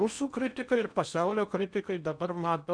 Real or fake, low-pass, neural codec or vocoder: fake; 9.9 kHz; codec, 16 kHz in and 24 kHz out, 0.8 kbps, FocalCodec, streaming, 65536 codes